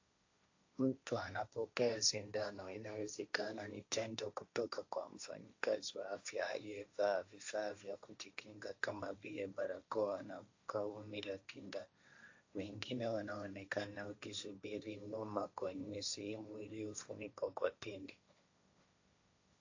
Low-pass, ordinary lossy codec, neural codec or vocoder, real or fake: 7.2 kHz; AAC, 48 kbps; codec, 16 kHz, 1.1 kbps, Voila-Tokenizer; fake